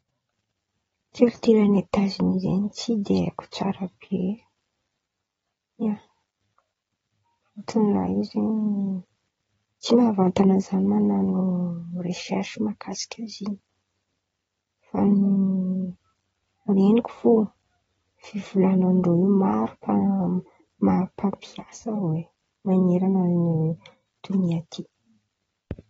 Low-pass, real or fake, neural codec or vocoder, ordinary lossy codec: 10.8 kHz; real; none; AAC, 24 kbps